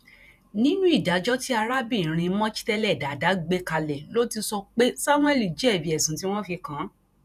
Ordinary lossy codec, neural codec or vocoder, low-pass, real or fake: none; vocoder, 48 kHz, 128 mel bands, Vocos; 14.4 kHz; fake